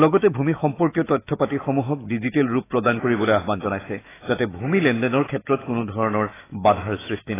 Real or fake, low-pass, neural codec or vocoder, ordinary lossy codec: fake; 3.6 kHz; autoencoder, 48 kHz, 128 numbers a frame, DAC-VAE, trained on Japanese speech; AAC, 16 kbps